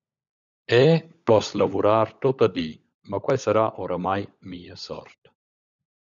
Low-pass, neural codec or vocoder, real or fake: 7.2 kHz; codec, 16 kHz, 16 kbps, FunCodec, trained on LibriTTS, 50 frames a second; fake